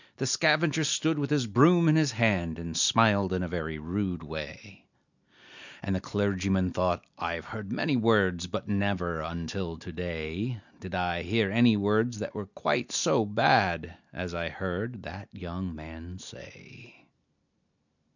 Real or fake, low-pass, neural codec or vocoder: real; 7.2 kHz; none